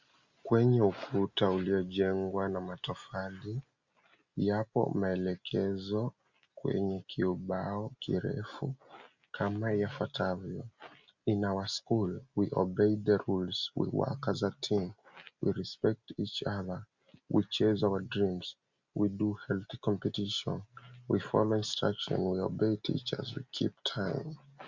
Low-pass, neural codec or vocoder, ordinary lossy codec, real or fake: 7.2 kHz; none; Opus, 64 kbps; real